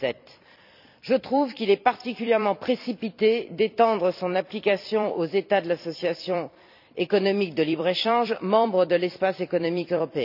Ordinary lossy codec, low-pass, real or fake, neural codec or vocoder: none; 5.4 kHz; fake; vocoder, 44.1 kHz, 128 mel bands every 256 samples, BigVGAN v2